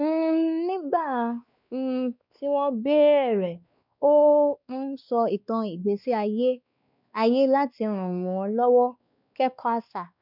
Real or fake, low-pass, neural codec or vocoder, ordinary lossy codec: fake; 5.4 kHz; codec, 16 kHz, 2 kbps, X-Codec, WavLM features, trained on Multilingual LibriSpeech; none